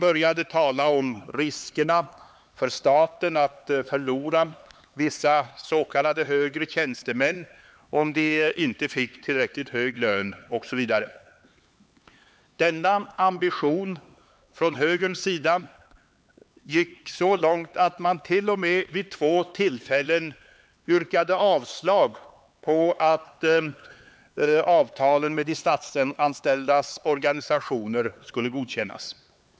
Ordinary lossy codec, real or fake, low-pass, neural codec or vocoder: none; fake; none; codec, 16 kHz, 4 kbps, X-Codec, HuBERT features, trained on LibriSpeech